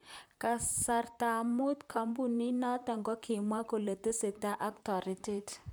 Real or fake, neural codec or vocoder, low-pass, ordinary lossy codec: fake; vocoder, 44.1 kHz, 128 mel bands, Pupu-Vocoder; none; none